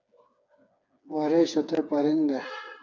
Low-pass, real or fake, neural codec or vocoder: 7.2 kHz; fake; codec, 16 kHz, 4 kbps, FreqCodec, smaller model